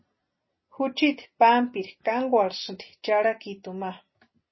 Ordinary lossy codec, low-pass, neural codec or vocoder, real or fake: MP3, 24 kbps; 7.2 kHz; none; real